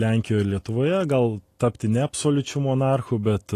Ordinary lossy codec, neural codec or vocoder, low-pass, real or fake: AAC, 48 kbps; none; 14.4 kHz; real